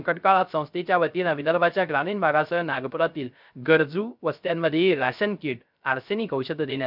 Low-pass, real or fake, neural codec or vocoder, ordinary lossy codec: 5.4 kHz; fake; codec, 16 kHz, 0.3 kbps, FocalCodec; none